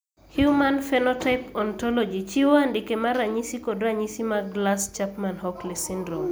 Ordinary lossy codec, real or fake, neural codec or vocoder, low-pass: none; real; none; none